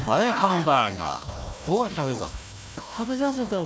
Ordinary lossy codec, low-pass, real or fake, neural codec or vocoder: none; none; fake; codec, 16 kHz, 1 kbps, FunCodec, trained on Chinese and English, 50 frames a second